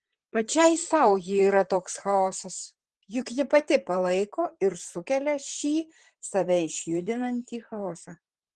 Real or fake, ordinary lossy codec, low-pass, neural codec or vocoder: fake; Opus, 16 kbps; 10.8 kHz; vocoder, 44.1 kHz, 128 mel bands, Pupu-Vocoder